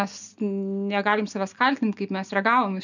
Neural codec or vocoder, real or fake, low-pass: none; real; 7.2 kHz